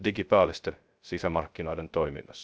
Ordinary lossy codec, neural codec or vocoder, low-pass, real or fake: none; codec, 16 kHz, 0.3 kbps, FocalCodec; none; fake